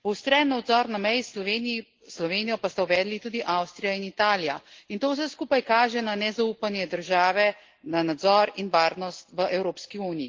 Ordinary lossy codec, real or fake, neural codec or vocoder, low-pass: Opus, 16 kbps; real; none; 7.2 kHz